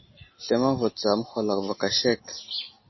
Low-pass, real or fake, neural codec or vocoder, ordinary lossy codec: 7.2 kHz; real; none; MP3, 24 kbps